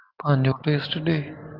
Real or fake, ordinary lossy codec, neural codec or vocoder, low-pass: fake; Opus, 32 kbps; vocoder, 44.1 kHz, 128 mel bands every 512 samples, BigVGAN v2; 5.4 kHz